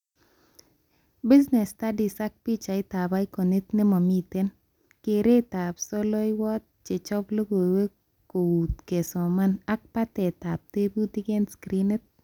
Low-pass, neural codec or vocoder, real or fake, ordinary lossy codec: 19.8 kHz; none; real; none